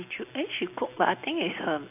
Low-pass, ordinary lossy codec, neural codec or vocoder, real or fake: 3.6 kHz; AAC, 32 kbps; none; real